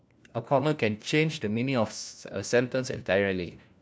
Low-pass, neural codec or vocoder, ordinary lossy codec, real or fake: none; codec, 16 kHz, 1 kbps, FunCodec, trained on LibriTTS, 50 frames a second; none; fake